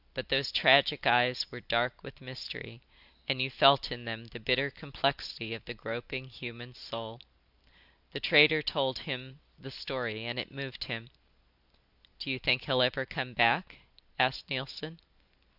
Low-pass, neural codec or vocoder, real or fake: 5.4 kHz; none; real